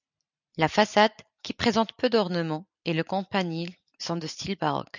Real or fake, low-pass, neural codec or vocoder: fake; 7.2 kHz; vocoder, 44.1 kHz, 128 mel bands every 256 samples, BigVGAN v2